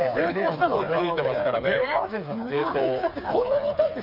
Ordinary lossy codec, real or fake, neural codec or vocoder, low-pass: none; fake; codec, 16 kHz, 4 kbps, FreqCodec, smaller model; 5.4 kHz